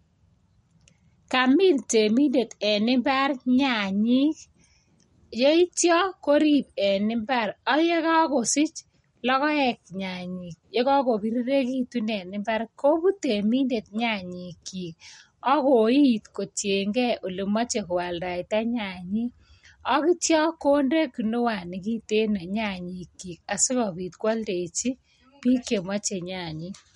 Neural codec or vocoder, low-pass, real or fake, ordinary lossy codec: none; 19.8 kHz; real; MP3, 48 kbps